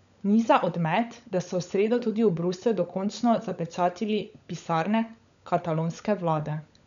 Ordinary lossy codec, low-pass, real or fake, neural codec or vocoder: none; 7.2 kHz; fake; codec, 16 kHz, 16 kbps, FunCodec, trained on LibriTTS, 50 frames a second